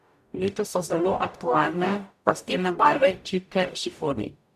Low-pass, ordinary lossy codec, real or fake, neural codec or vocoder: 14.4 kHz; none; fake; codec, 44.1 kHz, 0.9 kbps, DAC